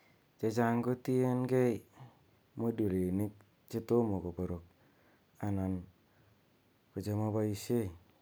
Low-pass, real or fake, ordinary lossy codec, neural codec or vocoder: none; real; none; none